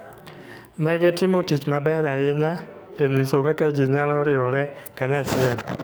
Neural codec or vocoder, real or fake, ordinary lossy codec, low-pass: codec, 44.1 kHz, 2.6 kbps, SNAC; fake; none; none